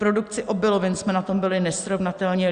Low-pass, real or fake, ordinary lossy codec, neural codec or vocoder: 9.9 kHz; real; Opus, 64 kbps; none